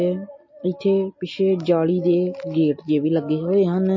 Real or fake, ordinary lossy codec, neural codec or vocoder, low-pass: real; MP3, 32 kbps; none; 7.2 kHz